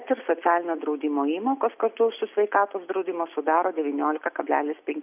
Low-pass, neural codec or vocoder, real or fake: 3.6 kHz; none; real